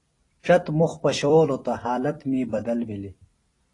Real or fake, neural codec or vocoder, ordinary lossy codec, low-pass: fake; vocoder, 44.1 kHz, 128 mel bands every 256 samples, BigVGAN v2; AAC, 32 kbps; 10.8 kHz